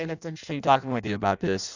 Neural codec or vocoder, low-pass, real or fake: codec, 16 kHz in and 24 kHz out, 0.6 kbps, FireRedTTS-2 codec; 7.2 kHz; fake